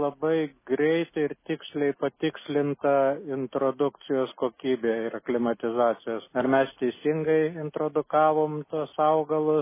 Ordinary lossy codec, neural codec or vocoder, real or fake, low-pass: MP3, 16 kbps; none; real; 3.6 kHz